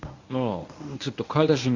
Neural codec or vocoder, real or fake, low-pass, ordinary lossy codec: codec, 24 kHz, 0.9 kbps, WavTokenizer, medium speech release version 1; fake; 7.2 kHz; AAC, 48 kbps